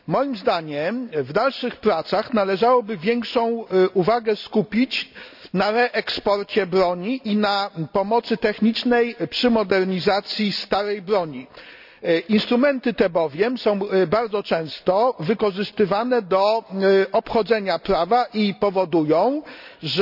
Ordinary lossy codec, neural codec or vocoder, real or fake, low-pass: none; none; real; 5.4 kHz